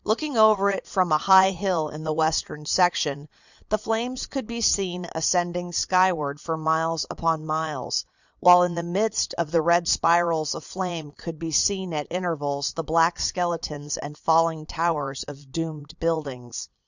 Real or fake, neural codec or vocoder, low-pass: fake; vocoder, 22.05 kHz, 80 mel bands, Vocos; 7.2 kHz